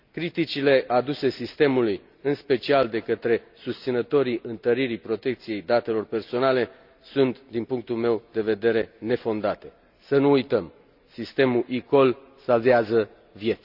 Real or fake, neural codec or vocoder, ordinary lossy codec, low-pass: real; none; none; 5.4 kHz